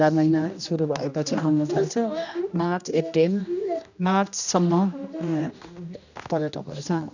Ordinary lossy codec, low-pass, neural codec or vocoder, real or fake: none; 7.2 kHz; codec, 16 kHz, 1 kbps, X-Codec, HuBERT features, trained on general audio; fake